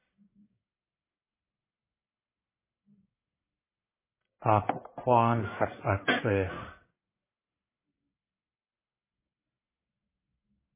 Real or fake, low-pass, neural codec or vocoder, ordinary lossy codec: fake; 3.6 kHz; codec, 44.1 kHz, 1.7 kbps, Pupu-Codec; MP3, 16 kbps